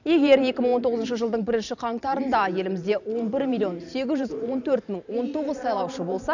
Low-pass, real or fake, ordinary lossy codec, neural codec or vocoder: 7.2 kHz; fake; none; vocoder, 44.1 kHz, 128 mel bands every 512 samples, BigVGAN v2